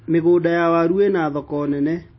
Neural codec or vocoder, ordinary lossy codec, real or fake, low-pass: none; MP3, 24 kbps; real; 7.2 kHz